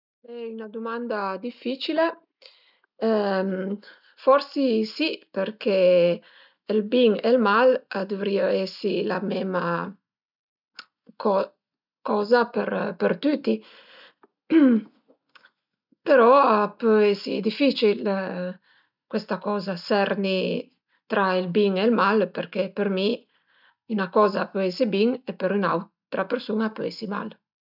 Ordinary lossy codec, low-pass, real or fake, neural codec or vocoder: none; 5.4 kHz; real; none